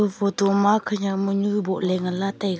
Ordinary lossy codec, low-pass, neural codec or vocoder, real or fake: none; none; none; real